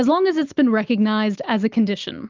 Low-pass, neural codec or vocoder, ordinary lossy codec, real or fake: 7.2 kHz; none; Opus, 32 kbps; real